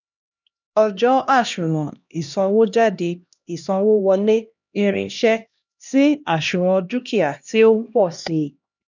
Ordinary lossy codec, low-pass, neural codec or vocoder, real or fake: none; 7.2 kHz; codec, 16 kHz, 1 kbps, X-Codec, HuBERT features, trained on LibriSpeech; fake